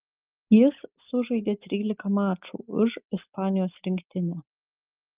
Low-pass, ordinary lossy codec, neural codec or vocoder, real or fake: 3.6 kHz; Opus, 32 kbps; none; real